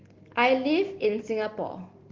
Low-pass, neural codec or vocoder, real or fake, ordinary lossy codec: 7.2 kHz; none; real; Opus, 16 kbps